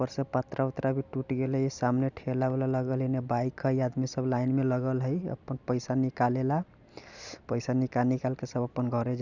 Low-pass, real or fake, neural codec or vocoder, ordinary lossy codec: 7.2 kHz; real; none; none